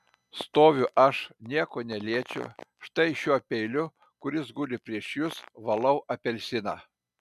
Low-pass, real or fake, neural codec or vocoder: 14.4 kHz; real; none